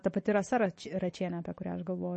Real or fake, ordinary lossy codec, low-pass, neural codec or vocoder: real; MP3, 32 kbps; 10.8 kHz; none